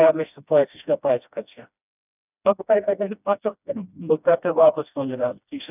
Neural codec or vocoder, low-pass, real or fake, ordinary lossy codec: codec, 16 kHz, 1 kbps, FreqCodec, smaller model; 3.6 kHz; fake; none